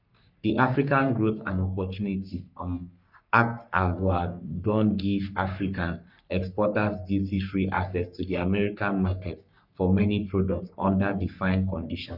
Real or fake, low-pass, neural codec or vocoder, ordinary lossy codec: fake; 5.4 kHz; codec, 44.1 kHz, 3.4 kbps, Pupu-Codec; none